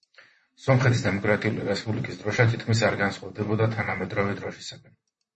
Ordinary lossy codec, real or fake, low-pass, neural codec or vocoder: MP3, 32 kbps; fake; 10.8 kHz; vocoder, 44.1 kHz, 128 mel bands, Pupu-Vocoder